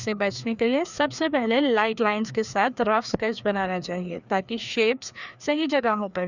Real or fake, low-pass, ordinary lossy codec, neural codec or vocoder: fake; 7.2 kHz; none; codec, 16 kHz, 2 kbps, FreqCodec, larger model